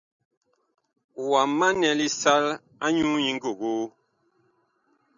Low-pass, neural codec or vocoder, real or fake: 7.2 kHz; none; real